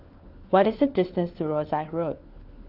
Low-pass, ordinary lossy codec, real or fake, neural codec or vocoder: 5.4 kHz; Opus, 32 kbps; fake; codec, 16 kHz, 4 kbps, FunCodec, trained on LibriTTS, 50 frames a second